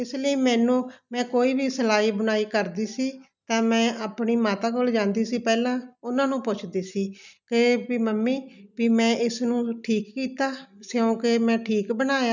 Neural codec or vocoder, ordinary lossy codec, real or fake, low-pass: none; none; real; 7.2 kHz